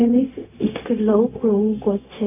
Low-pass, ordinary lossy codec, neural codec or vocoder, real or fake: 3.6 kHz; none; codec, 16 kHz, 0.4 kbps, LongCat-Audio-Codec; fake